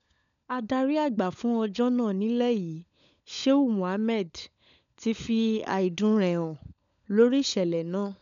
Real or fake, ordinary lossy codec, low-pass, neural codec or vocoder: fake; none; 7.2 kHz; codec, 16 kHz, 16 kbps, FunCodec, trained on LibriTTS, 50 frames a second